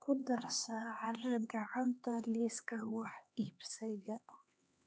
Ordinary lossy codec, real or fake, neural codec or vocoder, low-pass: none; fake; codec, 16 kHz, 2 kbps, X-Codec, HuBERT features, trained on LibriSpeech; none